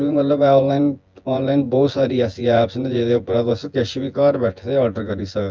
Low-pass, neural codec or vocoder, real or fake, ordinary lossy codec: 7.2 kHz; vocoder, 24 kHz, 100 mel bands, Vocos; fake; Opus, 24 kbps